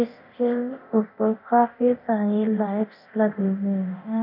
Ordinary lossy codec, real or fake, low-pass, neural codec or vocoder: none; fake; 5.4 kHz; codec, 24 kHz, 0.9 kbps, DualCodec